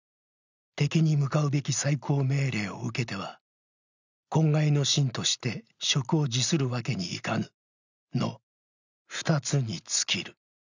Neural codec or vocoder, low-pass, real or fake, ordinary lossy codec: none; 7.2 kHz; real; none